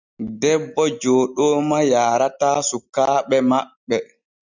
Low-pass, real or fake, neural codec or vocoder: 7.2 kHz; real; none